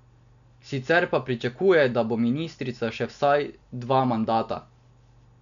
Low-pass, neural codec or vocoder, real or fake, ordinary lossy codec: 7.2 kHz; none; real; none